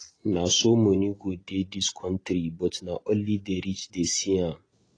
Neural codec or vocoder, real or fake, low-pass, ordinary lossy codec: none; real; 9.9 kHz; AAC, 32 kbps